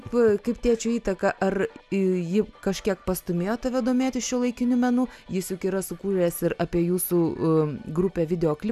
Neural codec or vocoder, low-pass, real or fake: none; 14.4 kHz; real